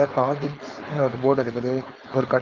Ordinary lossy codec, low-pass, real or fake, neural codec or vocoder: Opus, 32 kbps; 7.2 kHz; fake; codec, 16 kHz, 4.8 kbps, FACodec